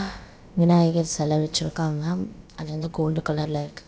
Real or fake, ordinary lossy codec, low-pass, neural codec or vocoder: fake; none; none; codec, 16 kHz, about 1 kbps, DyCAST, with the encoder's durations